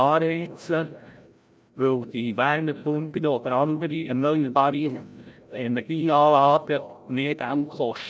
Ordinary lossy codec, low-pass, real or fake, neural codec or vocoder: none; none; fake; codec, 16 kHz, 0.5 kbps, FreqCodec, larger model